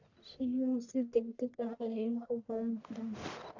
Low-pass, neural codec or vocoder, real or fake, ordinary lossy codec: 7.2 kHz; codec, 44.1 kHz, 1.7 kbps, Pupu-Codec; fake; none